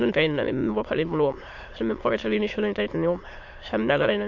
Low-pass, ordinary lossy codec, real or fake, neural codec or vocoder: 7.2 kHz; MP3, 48 kbps; fake; autoencoder, 22.05 kHz, a latent of 192 numbers a frame, VITS, trained on many speakers